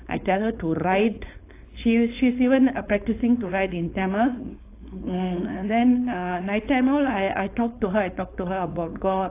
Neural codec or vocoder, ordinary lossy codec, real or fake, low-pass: codec, 16 kHz, 4.8 kbps, FACodec; AAC, 24 kbps; fake; 3.6 kHz